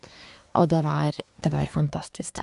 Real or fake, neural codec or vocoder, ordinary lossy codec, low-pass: fake; codec, 24 kHz, 1 kbps, SNAC; AAC, 96 kbps; 10.8 kHz